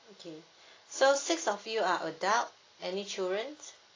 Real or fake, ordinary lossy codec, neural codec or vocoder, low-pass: real; AAC, 32 kbps; none; 7.2 kHz